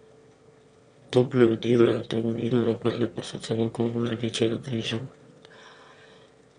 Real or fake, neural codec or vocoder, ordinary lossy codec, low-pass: fake; autoencoder, 22.05 kHz, a latent of 192 numbers a frame, VITS, trained on one speaker; MP3, 64 kbps; 9.9 kHz